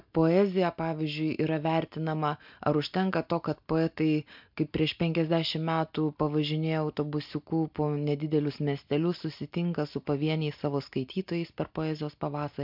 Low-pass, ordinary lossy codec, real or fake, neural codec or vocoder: 5.4 kHz; MP3, 48 kbps; real; none